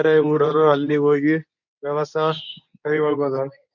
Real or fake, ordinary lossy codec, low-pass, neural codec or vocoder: fake; none; 7.2 kHz; codec, 24 kHz, 0.9 kbps, WavTokenizer, medium speech release version 2